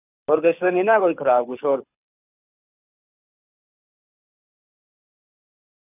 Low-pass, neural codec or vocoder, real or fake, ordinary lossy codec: 3.6 kHz; codec, 24 kHz, 6 kbps, HILCodec; fake; none